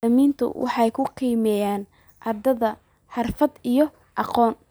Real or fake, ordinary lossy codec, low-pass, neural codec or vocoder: real; none; none; none